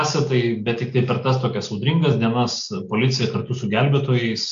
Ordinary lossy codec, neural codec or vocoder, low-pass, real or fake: AAC, 64 kbps; none; 7.2 kHz; real